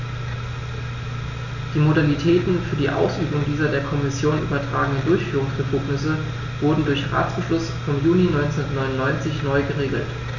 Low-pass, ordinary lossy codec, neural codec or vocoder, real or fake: 7.2 kHz; none; none; real